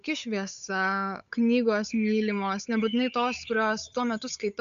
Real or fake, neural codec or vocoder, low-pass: fake; codec, 16 kHz, 8 kbps, FunCodec, trained on Chinese and English, 25 frames a second; 7.2 kHz